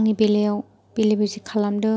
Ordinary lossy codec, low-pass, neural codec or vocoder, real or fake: none; none; none; real